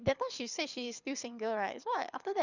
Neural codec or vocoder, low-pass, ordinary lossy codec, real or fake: codec, 24 kHz, 6 kbps, HILCodec; 7.2 kHz; none; fake